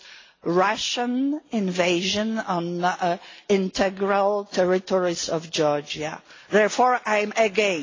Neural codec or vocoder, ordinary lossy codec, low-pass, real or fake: none; AAC, 32 kbps; 7.2 kHz; real